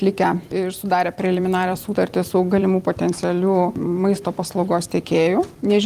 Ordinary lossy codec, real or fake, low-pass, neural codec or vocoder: Opus, 24 kbps; fake; 14.4 kHz; vocoder, 44.1 kHz, 128 mel bands every 256 samples, BigVGAN v2